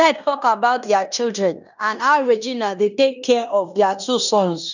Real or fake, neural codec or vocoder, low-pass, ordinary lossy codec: fake; codec, 16 kHz in and 24 kHz out, 0.9 kbps, LongCat-Audio-Codec, fine tuned four codebook decoder; 7.2 kHz; none